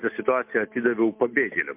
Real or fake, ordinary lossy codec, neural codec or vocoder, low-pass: real; MP3, 32 kbps; none; 3.6 kHz